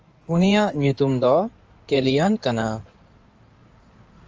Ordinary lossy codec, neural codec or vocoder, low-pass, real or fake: Opus, 24 kbps; codec, 16 kHz in and 24 kHz out, 2.2 kbps, FireRedTTS-2 codec; 7.2 kHz; fake